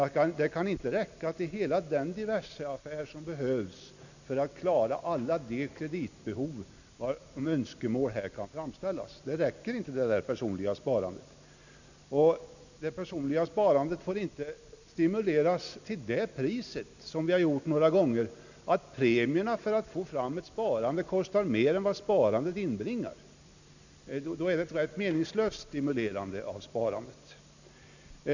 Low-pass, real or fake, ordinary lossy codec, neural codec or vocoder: 7.2 kHz; real; none; none